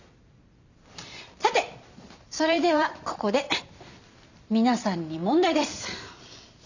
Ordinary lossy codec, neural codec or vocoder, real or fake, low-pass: none; vocoder, 44.1 kHz, 128 mel bands every 256 samples, BigVGAN v2; fake; 7.2 kHz